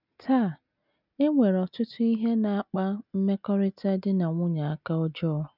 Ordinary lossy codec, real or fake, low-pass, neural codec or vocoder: none; real; 5.4 kHz; none